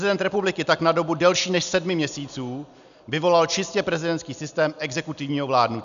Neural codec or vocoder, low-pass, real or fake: none; 7.2 kHz; real